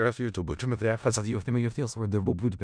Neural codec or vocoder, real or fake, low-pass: codec, 16 kHz in and 24 kHz out, 0.4 kbps, LongCat-Audio-Codec, four codebook decoder; fake; 9.9 kHz